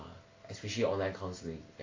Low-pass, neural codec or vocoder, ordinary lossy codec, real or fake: 7.2 kHz; none; AAC, 32 kbps; real